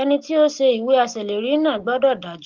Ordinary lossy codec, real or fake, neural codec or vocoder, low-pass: Opus, 16 kbps; real; none; 7.2 kHz